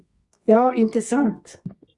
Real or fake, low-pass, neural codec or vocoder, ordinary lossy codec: fake; 10.8 kHz; codec, 24 kHz, 0.9 kbps, WavTokenizer, medium music audio release; Opus, 64 kbps